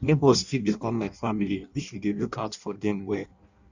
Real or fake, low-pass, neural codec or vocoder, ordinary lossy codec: fake; 7.2 kHz; codec, 16 kHz in and 24 kHz out, 0.6 kbps, FireRedTTS-2 codec; none